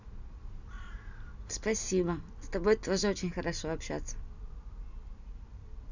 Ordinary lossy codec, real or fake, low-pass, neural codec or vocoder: none; real; 7.2 kHz; none